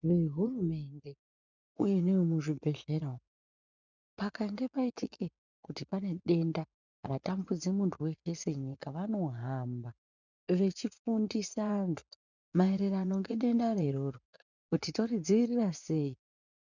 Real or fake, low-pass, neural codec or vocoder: fake; 7.2 kHz; vocoder, 24 kHz, 100 mel bands, Vocos